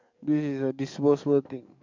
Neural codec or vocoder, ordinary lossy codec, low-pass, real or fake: codec, 44.1 kHz, 7.8 kbps, DAC; MP3, 64 kbps; 7.2 kHz; fake